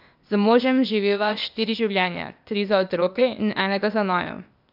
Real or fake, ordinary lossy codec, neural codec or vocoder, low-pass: fake; none; codec, 16 kHz, 0.8 kbps, ZipCodec; 5.4 kHz